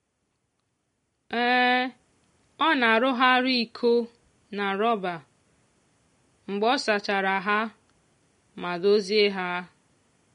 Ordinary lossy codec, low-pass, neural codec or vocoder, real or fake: MP3, 48 kbps; 10.8 kHz; none; real